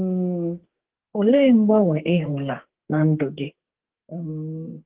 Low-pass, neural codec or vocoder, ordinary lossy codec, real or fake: 3.6 kHz; codec, 44.1 kHz, 2.6 kbps, SNAC; Opus, 16 kbps; fake